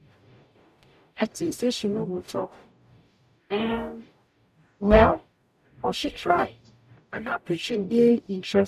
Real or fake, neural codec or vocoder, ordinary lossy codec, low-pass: fake; codec, 44.1 kHz, 0.9 kbps, DAC; none; 14.4 kHz